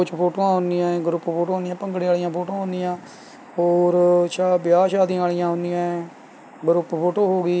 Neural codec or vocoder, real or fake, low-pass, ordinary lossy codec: none; real; none; none